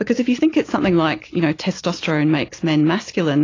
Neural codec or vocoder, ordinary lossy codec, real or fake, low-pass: none; AAC, 32 kbps; real; 7.2 kHz